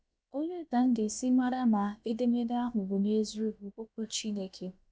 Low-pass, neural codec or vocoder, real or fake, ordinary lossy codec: none; codec, 16 kHz, about 1 kbps, DyCAST, with the encoder's durations; fake; none